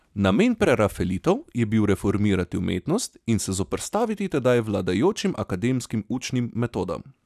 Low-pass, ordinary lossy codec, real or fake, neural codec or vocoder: 14.4 kHz; none; real; none